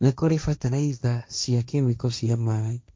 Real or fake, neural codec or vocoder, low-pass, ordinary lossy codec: fake; codec, 16 kHz, 1.1 kbps, Voila-Tokenizer; none; none